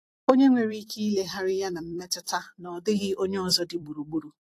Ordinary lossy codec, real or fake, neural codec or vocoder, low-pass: AAC, 64 kbps; fake; vocoder, 44.1 kHz, 128 mel bands every 256 samples, BigVGAN v2; 14.4 kHz